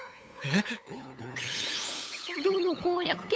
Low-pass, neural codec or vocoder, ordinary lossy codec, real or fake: none; codec, 16 kHz, 8 kbps, FunCodec, trained on LibriTTS, 25 frames a second; none; fake